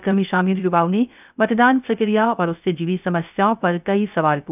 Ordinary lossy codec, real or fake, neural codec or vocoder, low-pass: none; fake; codec, 16 kHz, 0.3 kbps, FocalCodec; 3.6 kHz